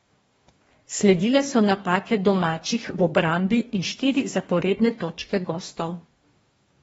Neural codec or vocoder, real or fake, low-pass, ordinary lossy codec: codec, 44.1 kHz, 2.6 kbps, DAC; fake; 19.8 kHz; AAC, 24 kbps